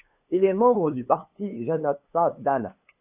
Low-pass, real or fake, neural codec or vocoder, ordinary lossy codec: 3.6 kHz; fake; codec, 16 kHz, 2 kbps, FunCodec, trained on LibriTTS, 25 frames a second; AAC, 32 kbps